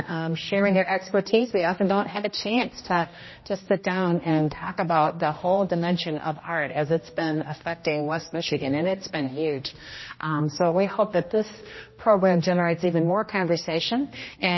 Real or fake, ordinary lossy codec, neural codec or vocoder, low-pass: fake; MP3, 24 kbps; codec, 16 kHz, 1 kbps, X-Codec, HuBERT features, trained on general audio; 7.2 kHz